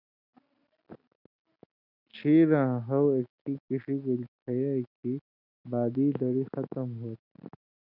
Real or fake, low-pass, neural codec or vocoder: real; 5.4 kHz; none